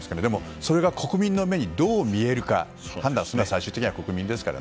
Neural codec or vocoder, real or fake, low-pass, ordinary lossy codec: none; real; none; none